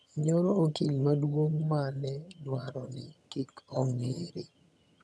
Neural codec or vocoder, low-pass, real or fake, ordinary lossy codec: vocoder, 22.05 kHz, 80 mel bands, HiFi-GAN; none; fake; none